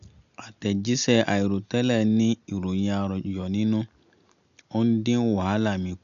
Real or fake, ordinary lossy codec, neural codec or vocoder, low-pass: real; none; none; 7.2 kHz